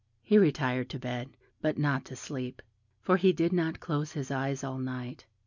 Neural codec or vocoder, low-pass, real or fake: none; 7.2 kHz; real